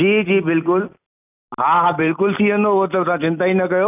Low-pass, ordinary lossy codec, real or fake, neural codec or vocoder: 3.6 kHz; none; real; none